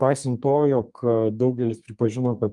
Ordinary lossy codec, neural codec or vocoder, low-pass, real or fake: Opus, 32 kbps; codec, 32 kHz, 1.9 kbps, SNAC; 10.8 kHz; fake